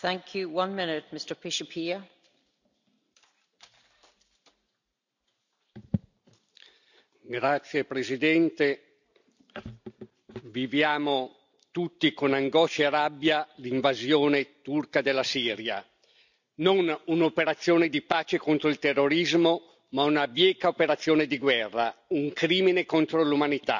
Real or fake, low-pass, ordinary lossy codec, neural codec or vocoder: real; 7.2 kHz; none; none